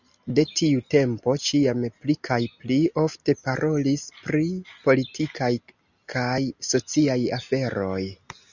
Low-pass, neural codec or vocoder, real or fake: 7.2 kHz; none; real